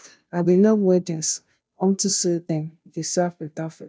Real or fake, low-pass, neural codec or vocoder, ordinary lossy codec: fake; none; codec, 16 kHz, 0.5 kbps, FunCodec, trained on Chinese and English, 25 frames a second; none